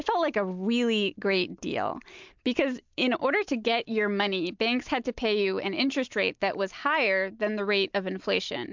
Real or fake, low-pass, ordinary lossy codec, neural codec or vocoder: real; 7.2 kHz; MP3, 64 kbps; none